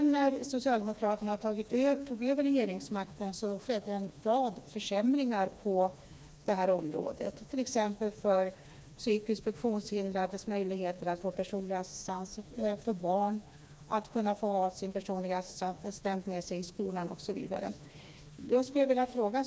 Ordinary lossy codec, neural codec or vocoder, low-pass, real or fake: none; codec, 16 kHz, 2 kbps, FreqCodec, smaller model; none; fake